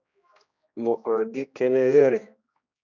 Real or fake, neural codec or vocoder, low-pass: fake; codec, 16 kHz, 1 kbps, X-Codec, HuBERT features, trained on general audio; 7.2 kHz